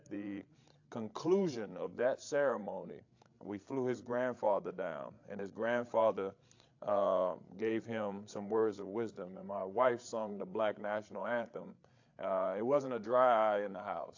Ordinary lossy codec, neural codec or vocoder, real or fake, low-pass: AAC, 48 kbps; codec, 16 kHz, 8 kbps, FreqCodec, larger model; fake; 7.2 kHz